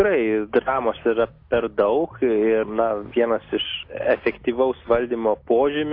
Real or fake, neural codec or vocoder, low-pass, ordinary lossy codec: real; none; 5.4 kHz; AAC, 32 kbps